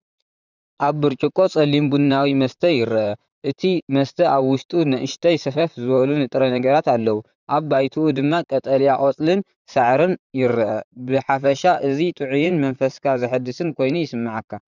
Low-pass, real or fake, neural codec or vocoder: 7.2 kHz; fake; vocoder, 44.1 kHz, 128 mel bands, Pupu-Vocoder